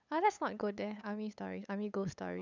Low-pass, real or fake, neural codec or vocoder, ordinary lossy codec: 7.2 kHz; fake; codec, 16 kHz, 8 kbps, FunCodec, trained on LibriTTS, 25 frames a second; none